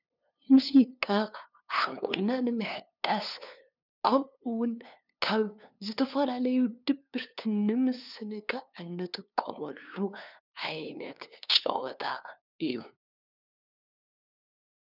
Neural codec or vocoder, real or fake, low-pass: codec, 16 kHz, 2 kbps, FunCodec, trained on LibriTTS, 25 frames a second; fake; 5.4 kHz